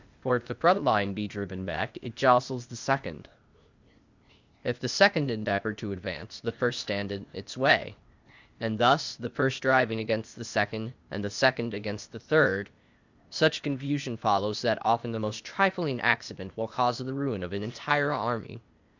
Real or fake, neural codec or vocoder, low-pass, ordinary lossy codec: fake; codec, 16 kHz, 0.8 kbps, ZipCodec; 7.2 kHz; Opus, 64 kbps